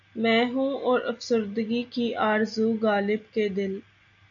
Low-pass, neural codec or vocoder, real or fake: 7.2 kHz; none; real